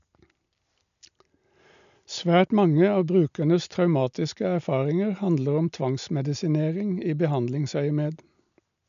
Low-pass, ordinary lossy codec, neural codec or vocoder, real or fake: 7.2 kHz; none; none; real